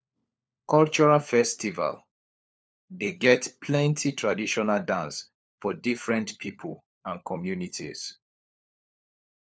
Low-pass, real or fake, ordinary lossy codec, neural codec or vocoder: none; fake; none; codec, 16 kHz, 4 kbps, FunCodec, trained on LibriTTS, 50 frames a second